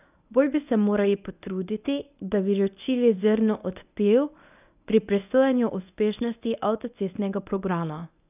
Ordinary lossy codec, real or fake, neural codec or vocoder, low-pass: none; fake; codec, 24 kHz, 0.9 kbps, WavTokenizer, medium speech release version 1; 3.6 kHz